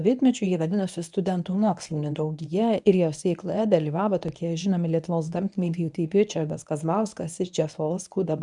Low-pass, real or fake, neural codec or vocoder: 10.8 kHz; fake; codec, 24 kHz, 0.9 kbps, WavTokenizer, medium speech release version 1